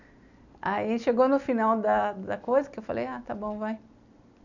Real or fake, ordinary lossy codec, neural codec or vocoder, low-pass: real; none; none; 7.2 kHz